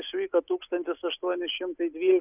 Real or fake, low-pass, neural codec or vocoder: real; 3.6 kHz; none